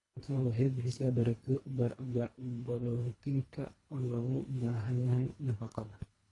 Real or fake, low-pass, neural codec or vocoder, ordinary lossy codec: fake; 10.8 kHz; codec, 24 kHz, 1.5 kbps, HILCodec; AAC, 32 kbps